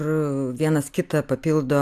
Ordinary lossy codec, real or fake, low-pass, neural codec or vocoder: AAC, 96 kbps; real; 14.4 kHz; none